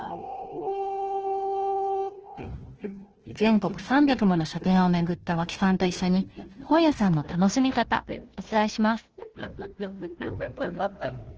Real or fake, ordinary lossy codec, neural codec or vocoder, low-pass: fake; Opus, 16 kbps; codec, 16 kHz, 1 kbps, FunCodec, trained on Chinese and English, 50 frames a second; 7.2 kHz